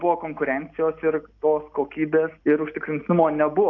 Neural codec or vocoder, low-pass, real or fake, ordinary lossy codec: none; 7.2 kHz; real; AAC, 48 kbps